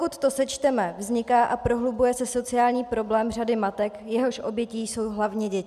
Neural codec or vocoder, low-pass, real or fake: none; 14.4 kHz; real